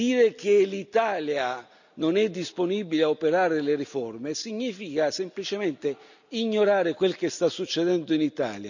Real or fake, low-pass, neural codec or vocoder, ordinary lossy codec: real; 7.2 kHz; none; none